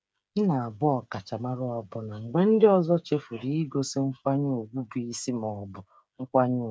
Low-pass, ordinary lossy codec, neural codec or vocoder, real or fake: none; none; codec, 16 kHz, 8 kbps, FreqCodec, smaller model; fake